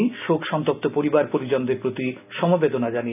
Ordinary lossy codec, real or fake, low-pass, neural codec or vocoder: none; real; 3.6 kHz; none